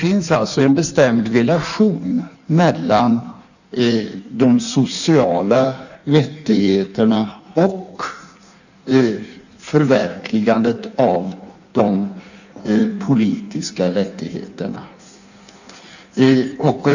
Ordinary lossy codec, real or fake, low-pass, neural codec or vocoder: none; fake; 7.2 kHz; codec, 16 kHz in and 24 kHz out, 1.1 kbps, FireRedTTS-2 codec